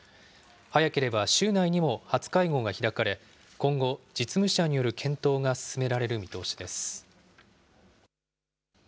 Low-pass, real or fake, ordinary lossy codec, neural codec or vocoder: none; real; none; none